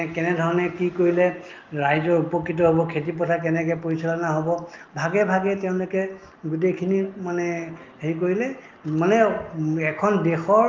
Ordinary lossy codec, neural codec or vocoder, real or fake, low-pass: Opus, 32 kbps; none; real; 7.2 kHz